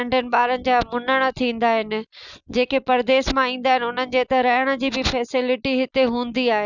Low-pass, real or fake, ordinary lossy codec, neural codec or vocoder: 7.2 kHz; real; none; none